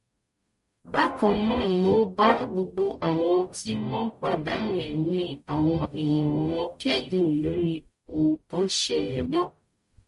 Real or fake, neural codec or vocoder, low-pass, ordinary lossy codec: fake; codec, 44.1 kHz, 0.9 kbps, DAC; 14.4 kHz; MP3, 48 kbps